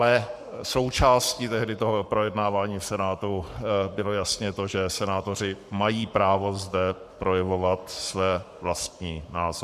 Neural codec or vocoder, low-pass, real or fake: codec, 44.1 kHz, 7.8 kbps, Pupu-Codec; 14.4 kHz; fake